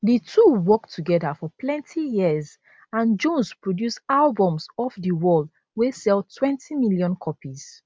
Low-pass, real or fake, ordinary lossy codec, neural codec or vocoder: none; real; none; none